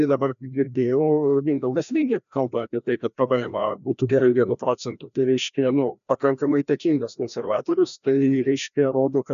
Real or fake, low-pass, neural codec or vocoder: fake; 7.2 kHz; codec, 16 kHz, 1 kbps, FreqCodec, larger model